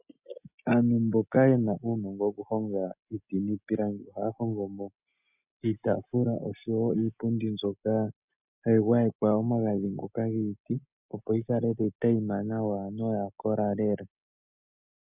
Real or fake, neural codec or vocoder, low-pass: real; none; 3.6 kHz